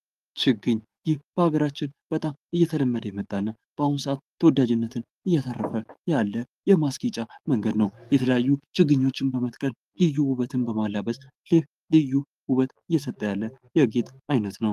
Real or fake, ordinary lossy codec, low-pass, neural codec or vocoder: fake; Opus, 32 kbps; 14.4 kHz; codec, 44.1 kHz, 7.8 kbps, DAC